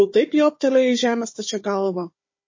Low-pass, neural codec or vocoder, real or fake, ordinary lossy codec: 7.2 kHz; codec, 16 kHz, 4 kbps, FunCodec, trained on Chinese and English, 50 frames a second; fake; MP3, 32 kbps